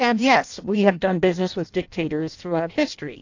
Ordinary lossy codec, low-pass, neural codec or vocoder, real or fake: AAC, 48 kbps; 7.2 kHz; codec, 16 kHz in and 24 kHz out, 0.6 kbps, FireRedTTS-2 codec; fake